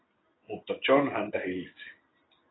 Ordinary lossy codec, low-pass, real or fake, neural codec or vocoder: AAC, 16 kbps; 7.2 kHz; real; none